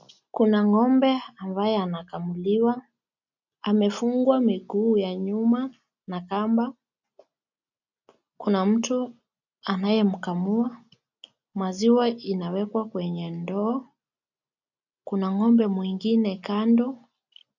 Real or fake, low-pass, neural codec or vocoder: real; 7.2 kHz; none